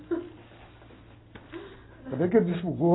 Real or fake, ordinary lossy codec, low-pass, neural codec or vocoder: real; AAC, 16 kbps; 7.2 kHz; none